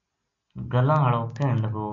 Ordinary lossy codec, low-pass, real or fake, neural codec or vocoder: MP3, 64 kbps; 7.2 kHz; real; none